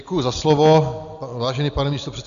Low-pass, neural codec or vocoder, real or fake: 7.2 kHz; none; real